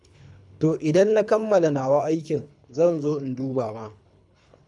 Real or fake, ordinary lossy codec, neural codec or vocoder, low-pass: fake; none; codec, 24 kHz, 3 kbps, HILCodec; 10.8 kHz